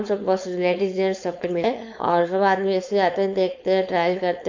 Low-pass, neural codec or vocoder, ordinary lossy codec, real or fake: 7.2 kHz; codec, 16 kHz, 4.8 kbps, FACodec; MP3, 48 kbps; fake